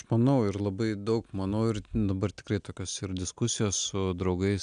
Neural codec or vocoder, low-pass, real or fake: none; 9.9 kHz; real